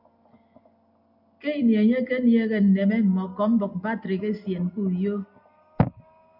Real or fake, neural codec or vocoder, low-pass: real; none; 5.4 kHz